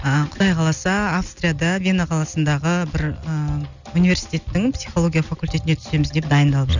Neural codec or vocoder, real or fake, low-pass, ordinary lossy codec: none; real; 7.2 kHz; none